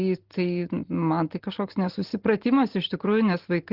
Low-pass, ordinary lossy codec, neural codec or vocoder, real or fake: 5.4 kHz; Opus, 16 kbps; none; real